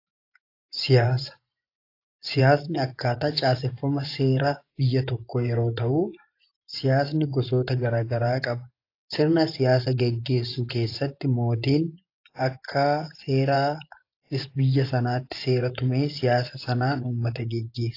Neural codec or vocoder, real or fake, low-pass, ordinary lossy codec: vocoder, 24 kHz, 100 mel bands, Vocos; fake; 5.4 kHz; AAC, 32 kbps